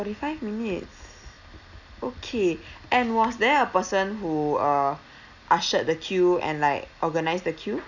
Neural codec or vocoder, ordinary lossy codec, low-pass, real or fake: none; none; 7.2 kHz; real